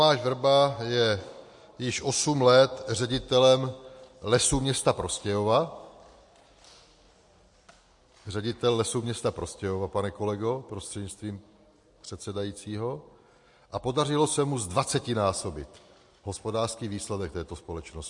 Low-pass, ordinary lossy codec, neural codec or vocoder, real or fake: 10.8 kHz; MP3, 48 kbps; none; real